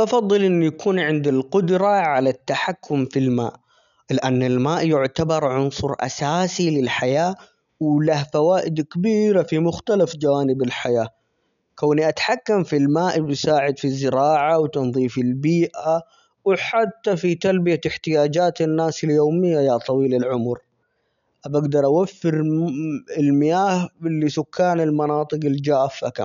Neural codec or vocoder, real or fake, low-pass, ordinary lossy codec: none; real; 7.2 kHz; none